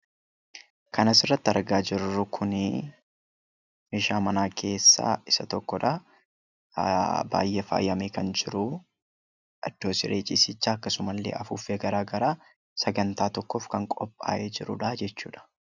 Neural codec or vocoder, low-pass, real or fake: none; 7.2 kHz; real